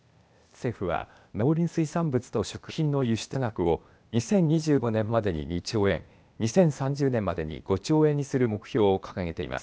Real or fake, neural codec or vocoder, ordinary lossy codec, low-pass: fake; codec, 16 kHz, 0.8 kbps, ZipCodec; none; none